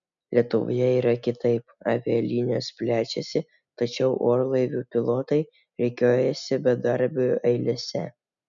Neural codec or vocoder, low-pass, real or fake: none; 7.2 kHz; real